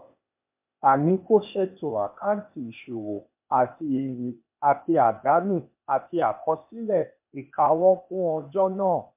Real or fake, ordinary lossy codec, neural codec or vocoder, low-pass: fake; MP3, 32 kbps; codec, 16 kHz, 0.8 kbps, ZipCodec; 3.6 kHz